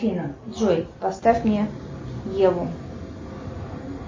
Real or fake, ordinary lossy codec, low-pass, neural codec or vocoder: real; MP3, 32 kbps; 7.2 kHz; none